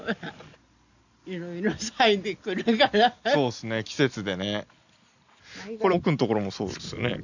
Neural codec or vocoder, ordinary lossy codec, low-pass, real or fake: none; none; 7.2 kHz; real